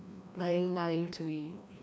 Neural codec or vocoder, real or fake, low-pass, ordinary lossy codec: codec, 16 kHz, 1 kbps, FreqCodec, larger model; fake; none; none